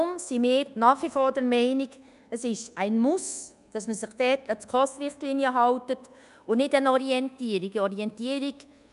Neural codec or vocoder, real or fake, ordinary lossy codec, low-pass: codec, 24 kHz, 1.2 kbps, DualCodec; fake; none; 10.8 kHz